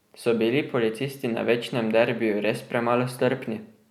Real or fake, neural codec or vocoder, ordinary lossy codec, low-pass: real; none; none; 19.8 kHz